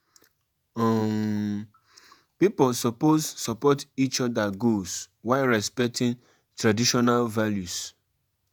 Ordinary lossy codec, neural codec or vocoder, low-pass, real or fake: none; vocoder, 48 kHz, 128 mel bands, Vocos; none; fake